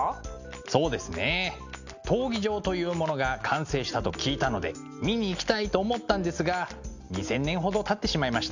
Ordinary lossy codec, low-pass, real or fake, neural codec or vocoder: none; 7.2 kHz; real; none